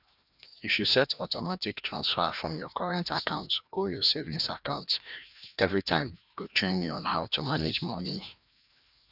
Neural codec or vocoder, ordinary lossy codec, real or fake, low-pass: codec, 16 kHz, 1 kbps, FreqCodec, larger model; none; fake; 5.4 kHz